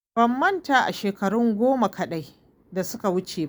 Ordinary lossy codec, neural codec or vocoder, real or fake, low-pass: none; none; real; none